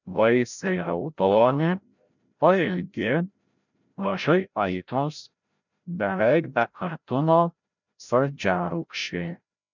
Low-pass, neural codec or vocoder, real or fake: 7.2 kHz; codec, 16 kHz, 0.5 kbps, FreqCodec, larger model; fake